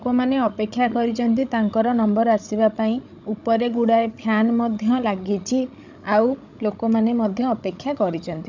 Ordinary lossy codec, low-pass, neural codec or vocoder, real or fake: none; 7.2 kHz; codec, 16 kHz, 16 kbps, FreqCodec, larger model; fake